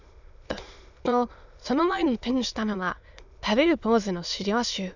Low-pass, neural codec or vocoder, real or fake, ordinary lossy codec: 7.2 kHz; autoencoder, 22.05 kHz, a latent of 192 numbers a frame, VITS, trained on many speakers; fake; none